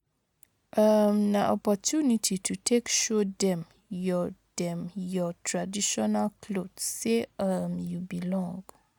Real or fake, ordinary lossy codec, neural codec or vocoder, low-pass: real; none; none; 19.8 kHz